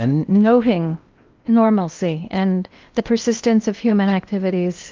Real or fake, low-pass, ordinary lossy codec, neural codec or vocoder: fake; 7.2 kHz; Opus, 24 kbps; codec, 16 kHz in and 24 kHz out, 0.8 kbps, FocalCodec, streaming, 65536 codes